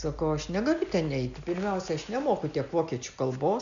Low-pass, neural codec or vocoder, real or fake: 7.2 kHz; none; real